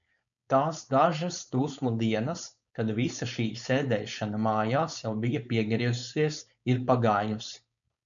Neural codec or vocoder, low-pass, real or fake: codec, 16 kHz, 4.8 kbps, FACodec; 7.2 kHz; fake